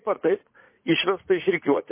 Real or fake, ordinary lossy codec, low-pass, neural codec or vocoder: fake; MP3, 24 kbps; 3.6 kHz; vocoder, 44.1 kHz, 80 mel bands, Vocos